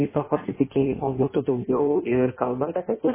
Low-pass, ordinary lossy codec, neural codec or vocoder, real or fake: 3.6 kHz; MP3, 16 kbps; codec, 16 kHz in and 24 kHz out, 0.6 kbps, FireRedTTS-2 codec; fake